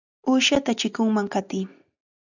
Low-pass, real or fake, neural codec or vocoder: 7.2 kHz; real; none